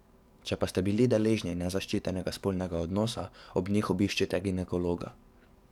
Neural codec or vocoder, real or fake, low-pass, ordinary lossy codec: codec, 44.1 kHz, 7.8 kbps, DAC; fake; 19.8 kHz; none